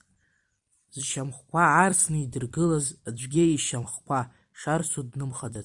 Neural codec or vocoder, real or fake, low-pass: vocoder, 44.1 kHz, 128 mel bands every 256 samples, BigVGAN v2; fake; 10.8 kHz